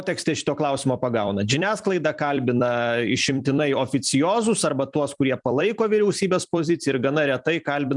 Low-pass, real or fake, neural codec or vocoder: 10.8 kHz; fake; vocoder, 44.1 kHz, 128 mel bands every 256 samples, BigVGAN v2